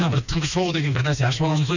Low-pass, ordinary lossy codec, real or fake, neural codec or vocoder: 7.2 kHz; none; fake; codec, 16 kHz, 2 kbps, FreqCodec, smaller model